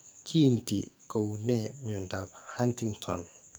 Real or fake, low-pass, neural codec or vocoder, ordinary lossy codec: fake; none; codec, 44.1 kHz, 2.6 kbps, SNAC; none